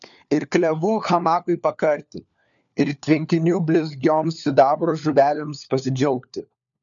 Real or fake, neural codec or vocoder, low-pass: fake; codec, 16 kHz, 4 kbps, FunCodec, trained on LibriTTS, 50 frames a second; 7.2 kHz